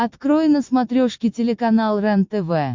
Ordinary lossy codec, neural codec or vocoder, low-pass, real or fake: MP3, 64 kbps; none; 7.2 kHz; real